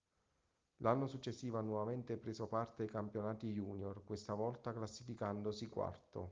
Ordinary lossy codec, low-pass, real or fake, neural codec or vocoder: Opus, 32 kbps; 7.2 kHz; real; none